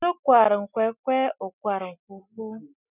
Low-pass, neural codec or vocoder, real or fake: 3.6 kHz; none; real